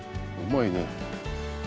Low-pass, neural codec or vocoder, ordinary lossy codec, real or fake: none; none; none; real